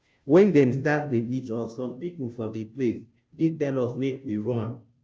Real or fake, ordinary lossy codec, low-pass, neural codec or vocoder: fake; none; none; codec, 16 kHz, 0.5 kbps, FunCodec, trained on Chinese and English, 25 frames a second